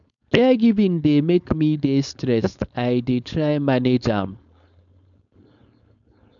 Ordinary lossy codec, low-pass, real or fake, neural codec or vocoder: none; 7.2 kHz; fake; codec, 16 kHz, 4.8 kbps, FACodec